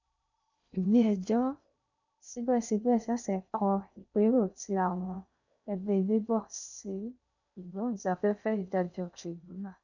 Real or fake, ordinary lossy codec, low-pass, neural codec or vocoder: fake; none; 7.2 kHz; codec, 16 kHz in and 24 kHz out, 0.6 kbps, FocalCodec, streaming, 2048 codes